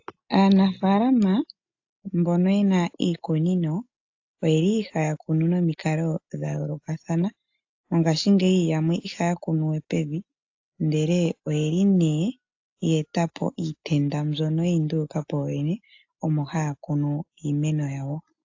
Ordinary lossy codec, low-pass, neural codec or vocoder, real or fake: AAC, 48 kbps; 7.2 kHz; none; real